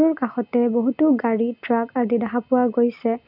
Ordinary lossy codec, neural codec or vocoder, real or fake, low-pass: none; none; real; 5.4 kHz